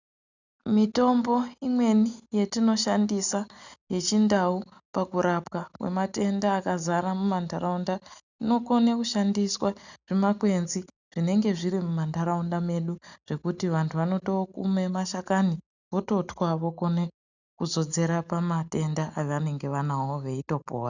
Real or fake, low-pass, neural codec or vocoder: real; 7.2 kHz; none